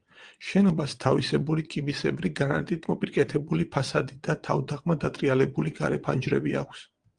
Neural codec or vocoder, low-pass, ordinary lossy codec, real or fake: vocoder, 22.05 kHz, 80 mel bands, WaveNeXt; 9.9 kHz; Opus, 24 kbps; fake